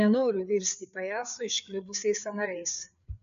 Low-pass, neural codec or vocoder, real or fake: 7.2 kHz; codec, 16 kHz, 4 kbps, FreqCodec, larger model; fake